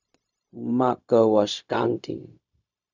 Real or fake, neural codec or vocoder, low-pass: fake; codec, 16 kHz, 0.4 kbps, LongCat-Audio-Codec; 7.2 kHz